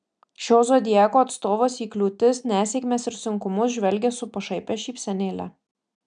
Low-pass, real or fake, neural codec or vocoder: 9.9 kHz; real; none